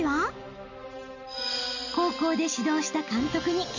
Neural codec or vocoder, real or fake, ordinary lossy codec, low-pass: none; real; none; 7.2 kHz